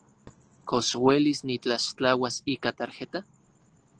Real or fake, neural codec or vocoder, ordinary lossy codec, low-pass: real; none; Opus, 16 kbps; 9.9 kHz